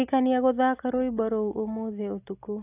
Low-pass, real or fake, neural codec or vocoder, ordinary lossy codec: 3.6 kHz; real; none; AAC, 24 kbps